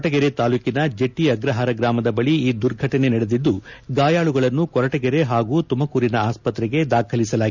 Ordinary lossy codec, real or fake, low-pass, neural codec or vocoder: none; real; 7.2 kHz; none